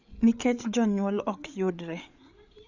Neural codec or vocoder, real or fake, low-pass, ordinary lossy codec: codec, 16 kHz, 8 kbps, FreqCodec, larger model; fake; 7.2 kHz; none